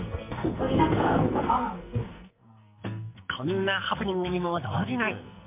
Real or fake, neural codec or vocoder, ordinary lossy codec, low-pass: fake; codec, 44.1 kHz, 2.6 kbps, SNAC; MP3, 32 kbps; 3.6 kHz